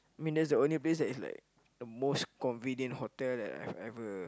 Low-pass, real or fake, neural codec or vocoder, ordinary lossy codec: none; real; none; none